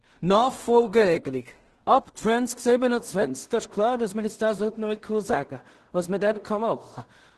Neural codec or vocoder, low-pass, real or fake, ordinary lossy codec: codec, 16 kHz in and 24 kHz out, 0.4 kbps, LongCat-Audio-Codec, two codebook decoder; 10.8 kHz; fake; Opus, 16 kbps